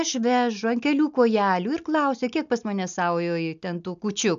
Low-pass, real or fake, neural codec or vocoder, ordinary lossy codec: 7.2 kHz; real; none; AAC, 96 kbps